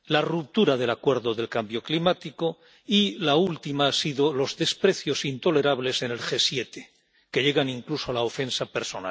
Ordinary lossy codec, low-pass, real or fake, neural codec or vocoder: none; none; real; none